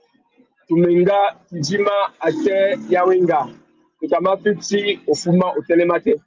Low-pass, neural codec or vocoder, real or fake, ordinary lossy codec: 7.2 kHz; none; real; Opus, 32 kbps